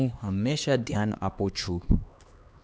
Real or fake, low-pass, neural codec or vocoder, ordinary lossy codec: fake; none; codec, 16 kHz, 2 kbps, X-Codec, HuBERT features, trained on LibriSpeech; none